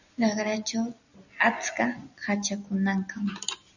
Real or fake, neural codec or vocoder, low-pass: real; none; 7.2 kHz